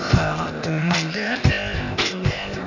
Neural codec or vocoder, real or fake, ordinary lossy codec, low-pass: codec, 16 kHz, 0.8 kbps, ZipCodec; fake; none; 7.2 kHz